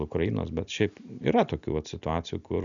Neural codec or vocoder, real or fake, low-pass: none; real; 7.2 kHz